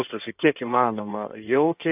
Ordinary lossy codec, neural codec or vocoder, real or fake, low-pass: AAC, 32 kbps; codec, 16 kHz in and 24 kHz out, 1.1 kbps, FireRedTTS-2 codec; fake; 3.6 kHz